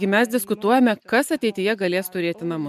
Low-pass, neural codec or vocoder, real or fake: 14.4 kHz; none; real